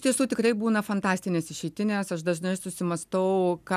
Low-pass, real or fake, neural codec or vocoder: 14.4 kHz; real; none